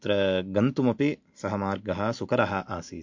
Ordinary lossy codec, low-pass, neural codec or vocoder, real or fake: MP3, 48 kbps; 7.2 kHz; none; real